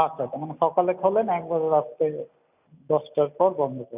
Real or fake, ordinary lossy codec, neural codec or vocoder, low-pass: real; none; none; 3.6 kHz